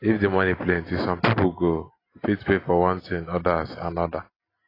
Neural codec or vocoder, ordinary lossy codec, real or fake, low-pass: none; AAC, 24 kbps; real; 5.4 kHz